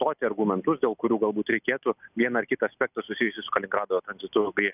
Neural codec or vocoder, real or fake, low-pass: none; real; 3.6 kHz